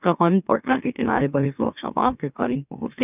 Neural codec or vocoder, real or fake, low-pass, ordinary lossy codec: autoencoder, 44.1 kHz, a latent of 192 numbers a frame, MeloTTS; fake; 3.6 kHz; none